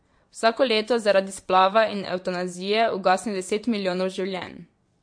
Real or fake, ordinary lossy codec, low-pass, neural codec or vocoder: fake; MP3, 48 kbps; 9.9 kHz; vocoder, 22.05 kHz, 80 mel bands, WaveNeXt